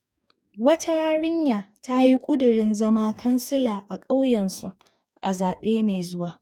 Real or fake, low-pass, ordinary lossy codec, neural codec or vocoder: fake; 19.8 kHz; none; codec, 44.1 kHz, 2.6 kbps, DAC